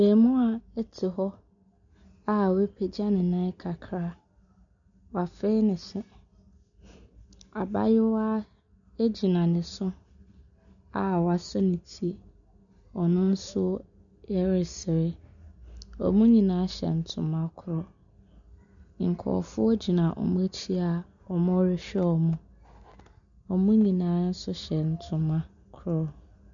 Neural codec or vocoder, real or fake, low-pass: none; real; 7.2 kHz